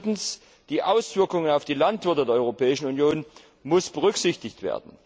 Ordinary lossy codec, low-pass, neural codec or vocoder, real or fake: none; none; none; real